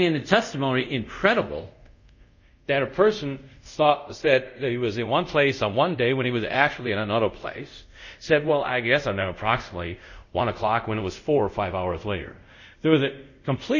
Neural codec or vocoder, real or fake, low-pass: codec, 24 kHz, 0.5 kbps, DualCodec; fake; 7.2 kHz